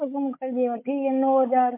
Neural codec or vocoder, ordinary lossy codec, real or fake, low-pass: codec, 16 kHz, 16 kbps, FunCodec, trained on Chinese and English, 50 frames a second; MP3, 24 kbps; fake; 3.6 kHz